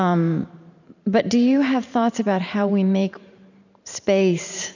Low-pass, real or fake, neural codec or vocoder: 7.2 kHz; fake; vocoder, 44.1 kHz, 128 mel bands every 512 samples, BigVGAN v2